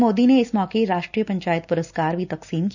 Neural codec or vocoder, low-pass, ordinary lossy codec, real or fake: none; 7.2 kHz; none; real